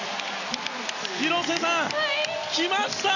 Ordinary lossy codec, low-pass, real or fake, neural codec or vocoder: none; 7.2 kHz; real; none